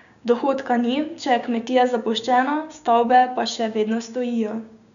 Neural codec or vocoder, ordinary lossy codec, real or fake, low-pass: codec, 16 kHz, 6 kbps, DAC; none; fake; 7.2 kHz